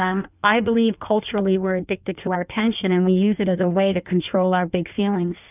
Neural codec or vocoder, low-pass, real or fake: codec, 16 kHz in and 24 kHz out, 1.1 kbps, FireRedTTS-2 codec; 3.6 kHz; fake